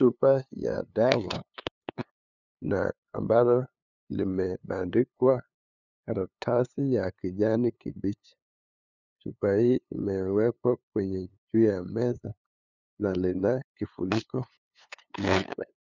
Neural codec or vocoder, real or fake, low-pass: codec, 16 kHz, 2 kbps, FunCodec, trained on LibriTTS, 25 frames a second; fake; 7.2 kHz